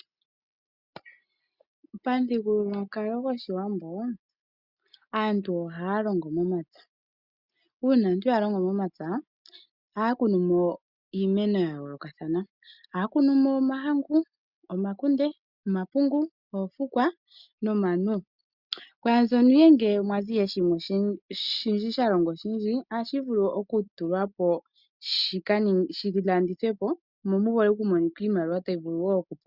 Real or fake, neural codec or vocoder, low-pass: real; none; 5.4 kHz